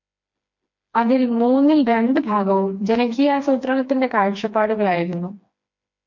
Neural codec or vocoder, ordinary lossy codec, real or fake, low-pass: codec, 16 kHz, 2 kbps, FreqCodec, smaller model; MP3, 48 kbps; fake; 7.2 kHz